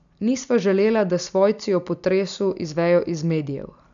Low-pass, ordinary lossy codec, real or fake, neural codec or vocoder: 7.2 kHz; none; real; none